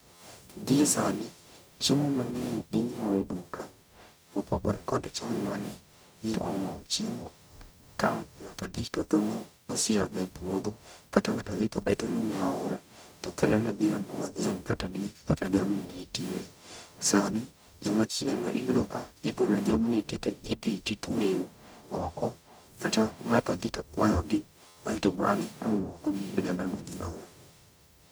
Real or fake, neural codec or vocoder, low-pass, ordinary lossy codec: fake; codec, 44.1 kHz, 0.9 kbps, DAC; none; none